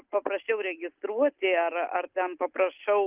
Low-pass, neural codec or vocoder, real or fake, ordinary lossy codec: 3.6 kHz; none; real; Opus, 24 kbps